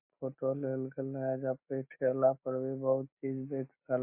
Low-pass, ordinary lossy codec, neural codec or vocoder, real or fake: 3.6 kHz; MP3, 24 kbps; none; real